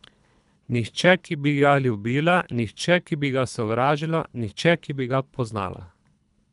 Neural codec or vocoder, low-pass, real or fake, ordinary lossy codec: codec, 24 kHz, 3 kbps, HILCodec; 10.8 kHz; fake; none